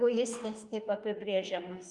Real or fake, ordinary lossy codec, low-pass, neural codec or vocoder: fake; Opus, 32 kbps; 10.8 kHz; autoencoder, 48 kHz, 32 numbers a frame, DAC-VAE, trained on Japanese speech